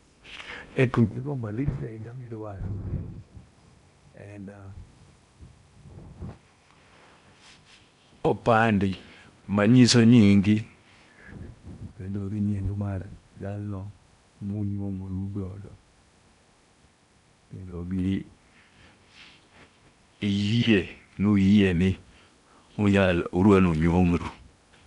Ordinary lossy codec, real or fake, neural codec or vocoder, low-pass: none; fake; codec, 16 kHz in and 24 kHz out, 0.8 kbps, FocalCodec, streaming, 65536 codes; 10.8 kHz